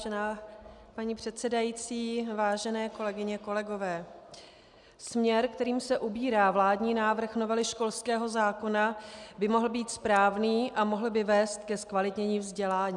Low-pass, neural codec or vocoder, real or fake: 10.8 kHz; none; real